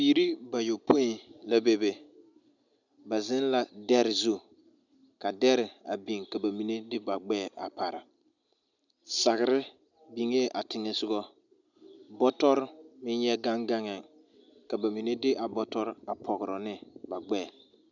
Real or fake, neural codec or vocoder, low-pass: real; none; 7.2 kHz